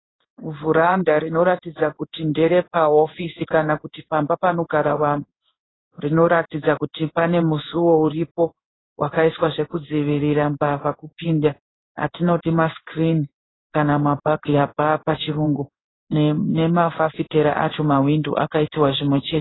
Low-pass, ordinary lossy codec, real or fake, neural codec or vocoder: 7.2 kHz; AAC, 16 kbps; fake; codec, 16 kHz in and 24 kHz out, 1 kbps, XY-Tokenizer